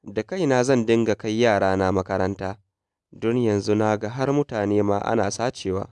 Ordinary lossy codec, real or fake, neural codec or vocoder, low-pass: none; real; none; none